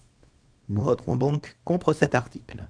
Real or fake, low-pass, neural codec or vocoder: fake; 9.9 kHz; codec, 24 kHz, 0.9 kbps, WavTokenizer, small release